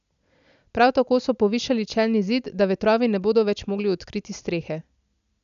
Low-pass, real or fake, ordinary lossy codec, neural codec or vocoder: 7.2 kHz; real; none; none